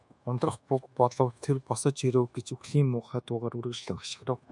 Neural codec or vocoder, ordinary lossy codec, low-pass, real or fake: codec, 24 kHz, 1.2 kbps, DualCodec; MP3, 96 kbps; 10.8 kHz; fake